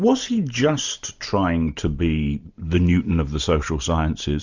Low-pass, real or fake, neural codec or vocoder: 7.2 kHz; real; none